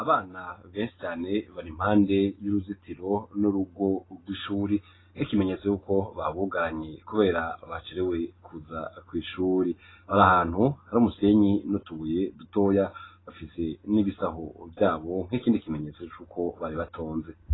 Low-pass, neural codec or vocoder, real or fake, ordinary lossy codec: 7.2 kHz; none; real; AAC, 16 kbps